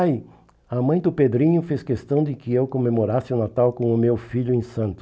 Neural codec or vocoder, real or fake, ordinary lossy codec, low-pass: none; real; none; none